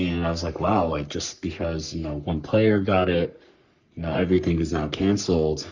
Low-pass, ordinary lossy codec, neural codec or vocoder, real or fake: 7.2 kHz; Opus, 64 kbps; codec, 44.1 kHz, 3.4 kbps, Pupu-Codec; fake